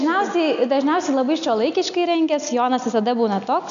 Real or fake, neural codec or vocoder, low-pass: real; none; 7.2 kHz